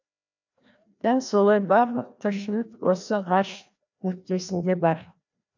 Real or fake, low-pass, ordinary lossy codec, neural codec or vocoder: fake; 7.2 kHz; none; codec, 16 kHz, 1 kbps, FreqCodec, larger model